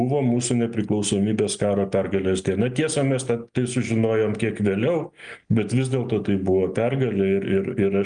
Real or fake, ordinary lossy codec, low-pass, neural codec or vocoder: real; Opus, 32 kbps; 9.9 kHz; none